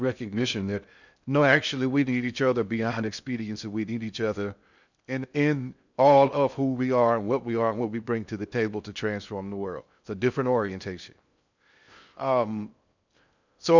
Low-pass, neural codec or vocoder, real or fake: 7.2 kHz; codec, 16 kHz in and 24 kHz out, 0.6 kbps, FocalCodec, streaming, 2048 codes; fake